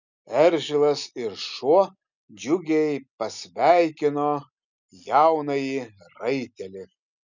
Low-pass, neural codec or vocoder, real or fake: 7.2 kHz; none; real